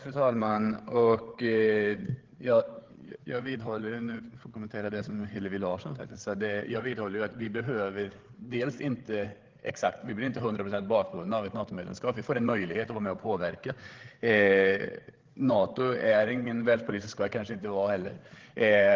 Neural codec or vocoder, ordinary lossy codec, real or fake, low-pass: codec, 16 kHz, 8 kbps, FreqCodec, larger model; Opus, 16 kbps; fake; 7.2 kHz